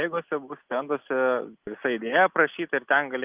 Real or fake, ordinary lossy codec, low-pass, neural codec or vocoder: real; Opus, 24 kbps; 3.6 kHz; none